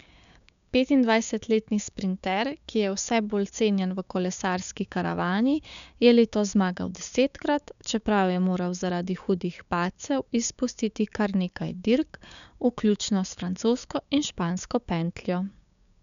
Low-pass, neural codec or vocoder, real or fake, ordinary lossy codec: 7.2 kHz; codec, 16 kHz, 6 kbps, DAC; fake; none